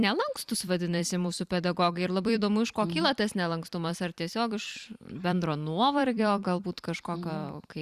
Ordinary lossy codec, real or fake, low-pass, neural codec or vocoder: Opus, 64 kbps; fake; 14.4 kHz; vocoder, 44.1 kHz, 128 mel bands every 256 samples, BigVGAN v2